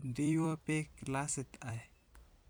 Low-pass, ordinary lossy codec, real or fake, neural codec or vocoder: none; none; fake; vocoder, 44.1 kHz, 128 mel bands every 256 samples, BigVGAN v2